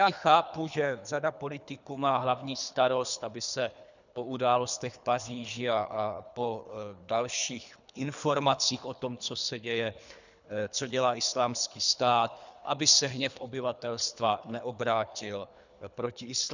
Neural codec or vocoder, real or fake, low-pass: codec, 24 kHz, 3 kbps, HILCodec; fake; 7.2 kHz